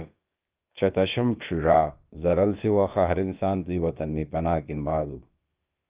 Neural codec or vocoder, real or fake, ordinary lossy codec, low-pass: codec, 16 kHz, about 1 kbps, DyCAST, with the encoder's durations; fake; Opus, 32 kbps; 3.6 kHz